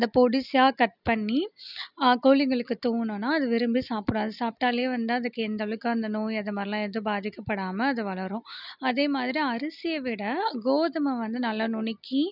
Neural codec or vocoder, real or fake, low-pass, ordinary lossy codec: none; real; 5.4 kHz; none